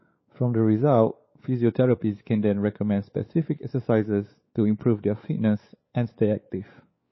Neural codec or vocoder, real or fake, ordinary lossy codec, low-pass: codec, 16 kHz, 4 kbps, X-Codec, WavLM features, trained on Multilingual LibriSpeech; fake; MP3, 24 kbps; 7.2 kHz